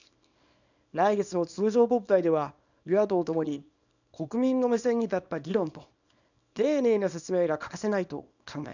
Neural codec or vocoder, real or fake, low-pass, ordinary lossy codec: codec, 24 kHz, 0.9 kbps, WavTokenizer, small release; fake; 7.2 kHz; none